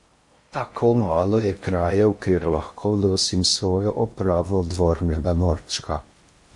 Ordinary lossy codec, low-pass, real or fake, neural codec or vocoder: MP3, 48 kbps; 10.8 kHz; fake; codec, 16 kHz in and 24 kHz out, 0.6 kbps, FocalCodec, streaming, 4096 codes